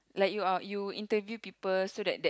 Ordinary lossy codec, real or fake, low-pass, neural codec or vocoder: none; real; none; none